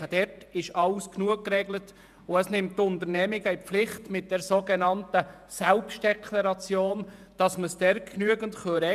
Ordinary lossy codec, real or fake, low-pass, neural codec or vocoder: none; fake; 14.4 kHz; vocoder, 48 kHz, 128 mel bands, Vocos